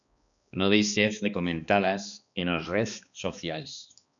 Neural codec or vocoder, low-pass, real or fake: codec, 16 kHz, 2 kbps, X-Codec, HuBERT features, trained on balanced general audio; 7.2 kHz; fake